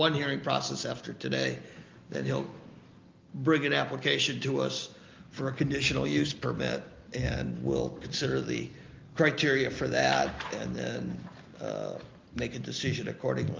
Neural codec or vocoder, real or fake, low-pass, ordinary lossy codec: none; real; 7.2 kHz; Opus, 32 kbps